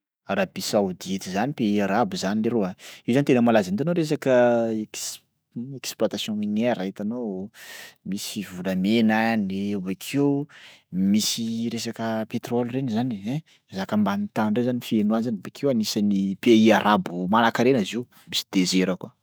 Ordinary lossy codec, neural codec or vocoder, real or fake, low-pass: none; autoencoder, 48 kHz, 128 numbers a frame, DAC-VAE, trained on Japanese speech; fake; none